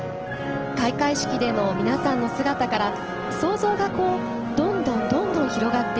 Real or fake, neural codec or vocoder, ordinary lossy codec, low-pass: real; none; Opus, 16 kbps; 7.2 kHz